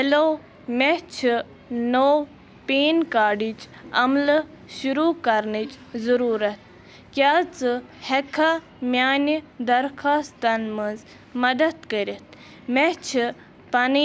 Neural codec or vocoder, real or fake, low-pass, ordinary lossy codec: none; real; none; none